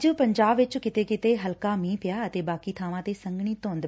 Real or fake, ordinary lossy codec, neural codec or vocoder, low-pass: real; none; none; none